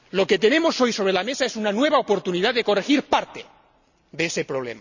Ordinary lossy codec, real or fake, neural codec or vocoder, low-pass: none; real; none; 7.2 kHz